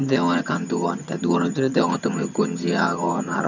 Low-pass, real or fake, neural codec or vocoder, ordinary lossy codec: 7.2 kHz; fake; vocoder, 22.05 kHz, 80 mel bands, HiFi-GAN; none